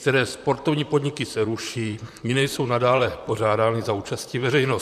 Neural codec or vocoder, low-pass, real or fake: vocoder, 44.1 kHz, 128 mel bands, Pupu-Vocoder; 14.4 kHz; fake